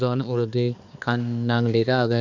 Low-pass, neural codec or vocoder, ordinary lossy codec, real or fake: 7.2 kHz; codec, 16 kHz, 2 kbps, X-Codec, HuBERT features, trained on balanced general audio; none; fake